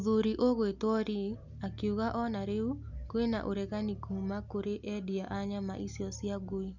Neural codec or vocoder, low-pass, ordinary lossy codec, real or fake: none; 7.2 kHz; none; real